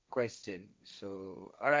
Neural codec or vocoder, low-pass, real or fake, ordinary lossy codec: codec, 16 kHz, 1.1 kbps, Voila-Tokenizer; none; fake; none